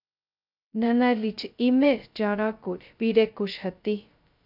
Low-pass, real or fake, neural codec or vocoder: 5.4 kHz; fake; codec, 16 kHz, 0.2 kbps, FocalCodec